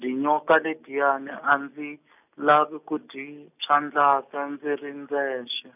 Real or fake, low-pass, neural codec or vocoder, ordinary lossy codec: real; 3.6 kHz; none; none